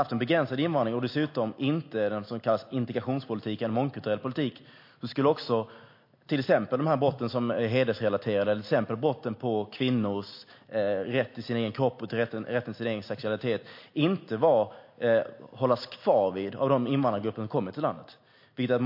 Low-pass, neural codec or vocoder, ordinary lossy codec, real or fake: 5.4 kHz; none; MP3, 32 kbps; real